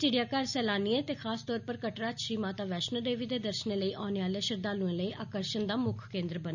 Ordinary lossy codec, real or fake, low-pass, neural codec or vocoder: none; real; 7.2 kHz; none